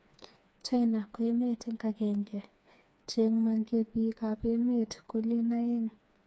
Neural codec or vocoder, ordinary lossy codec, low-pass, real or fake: codec, 16 kHz, 4 kbps, FreqCodec, smaller model; none; none; fake